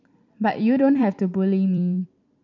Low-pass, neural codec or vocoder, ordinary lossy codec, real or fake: 7.2 kHz; vocoder, 44.1 kHz, 128 mel bands every 256 samples, BigVGAN v2; none; fake